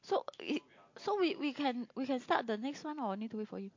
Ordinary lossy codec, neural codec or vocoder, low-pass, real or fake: MP3, 48 kbps; none; 7.2 kHz; real